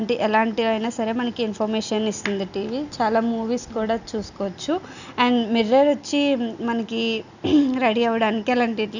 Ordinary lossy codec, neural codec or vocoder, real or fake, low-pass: none; none; real; 7.2 kHz